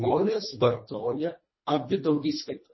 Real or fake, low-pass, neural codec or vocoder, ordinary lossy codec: fake; 7.2 kHz; codec, 24 kHz, 1.5 kbps, HILCodec; MP3, 24 kbps